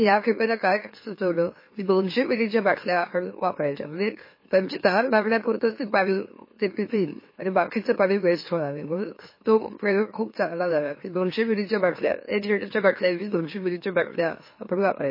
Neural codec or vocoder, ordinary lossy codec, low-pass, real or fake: autoencoder, 44.1 kHz, a latent of 192 numbers a frame, MeloTTS; MP3, 24 kbps; 5.4 kHz; fake